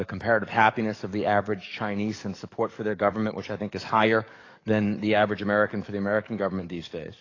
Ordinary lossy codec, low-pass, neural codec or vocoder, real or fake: AAC, 32 kbps; 7.2 kHz; codec, 44.1 kHz, 7.8 kbps, DAC; fake